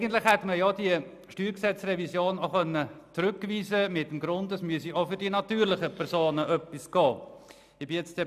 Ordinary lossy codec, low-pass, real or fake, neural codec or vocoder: none; 14.4 kHz; real; none